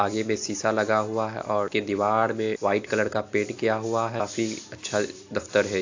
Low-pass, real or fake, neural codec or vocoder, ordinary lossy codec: 7.2 kHz; real; none; AAC, 48 kbps